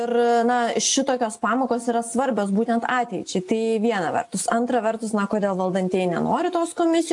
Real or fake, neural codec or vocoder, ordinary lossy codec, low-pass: real; none; AAC, 64 kbps; 10.8 kHz